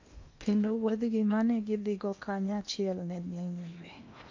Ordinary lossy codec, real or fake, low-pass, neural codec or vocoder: MP3, 48 kbps; fake; 7.2 kHz; codec, 16 kHz in and 24 kHz out, 0.8 kbps, FocalCodec, streaming, 65536 codes